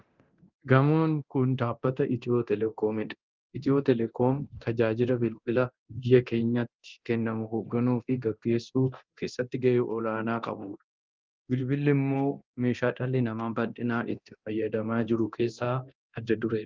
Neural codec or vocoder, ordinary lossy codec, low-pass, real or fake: codec, 24 kHz, 0.9 kbps, DualCodec; Opus, 32 kbps; 7.2 kHz; fake